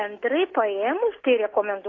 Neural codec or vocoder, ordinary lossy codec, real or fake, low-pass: none; AAC, 32 kbps; real; 7.2 kHz